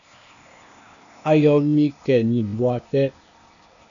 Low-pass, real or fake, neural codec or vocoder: 7.2 kHz; fake; codec, 16 kHz, 0.8 kbps, ZipCodec